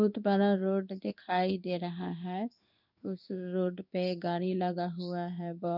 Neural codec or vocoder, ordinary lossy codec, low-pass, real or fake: codec, 16 kHz in and 24 kHz out, 1 kbps, XY-Tokenizer; none; 5.4 kHz; fake